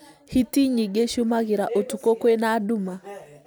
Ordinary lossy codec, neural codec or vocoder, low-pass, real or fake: none; none; none; real